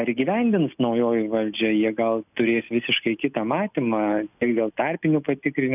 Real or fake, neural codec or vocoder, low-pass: real; none; 3.6 kHz